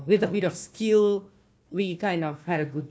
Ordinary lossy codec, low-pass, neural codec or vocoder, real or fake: none; none; codec, 16 kHz, 1 kbps, FunCodec, trained on Chinese and English, 50 frames a second; fake